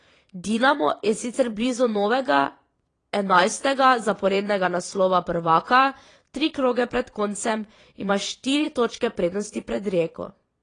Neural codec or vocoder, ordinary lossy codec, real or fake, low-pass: vocoder, 22.05 kHz, 80 mel bands, Vocos; AAC, 32 kbps; fake; 9.9 kHz